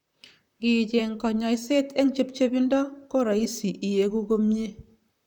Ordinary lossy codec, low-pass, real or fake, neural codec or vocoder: none; 19.8 kHz; fake; vocoder, 44.1 kHz, 128 mel bands, Pupu-Vocoder